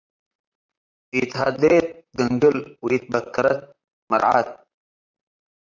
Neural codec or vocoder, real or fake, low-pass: vocoder, 44.1 kHz, 128 mel bands, Pupu-Vocoder; fake; 7.2 kHz